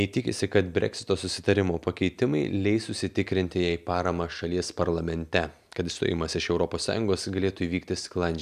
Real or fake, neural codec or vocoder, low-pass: real; none; 14.4 kHz